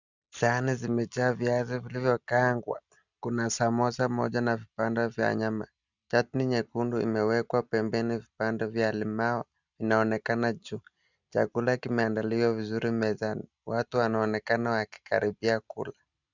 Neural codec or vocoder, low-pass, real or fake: none; 7.2 kHz; real